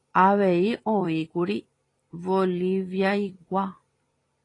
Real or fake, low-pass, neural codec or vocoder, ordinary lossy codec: fake; 10.8 kHz; vocoder, 44.1 kHz, 128 mel bands every 256 samples, BigVGAN v2; AAC, 32 kbps